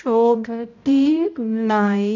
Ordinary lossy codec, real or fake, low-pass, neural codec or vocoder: none; fake; 7.2 kHz; codec, 16 kHz, 0.5 kbps, X-Codec, HuBERT features, trained on balanced general audio